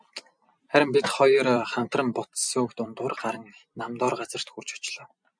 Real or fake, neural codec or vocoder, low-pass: fake; vocoder, 44.1 kHz, 128 mel bands every 256 samples, BigVGAN v2; 9.9 kHz